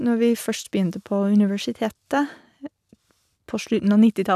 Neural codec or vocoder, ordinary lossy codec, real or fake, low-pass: none; none; real; 14.4 kHz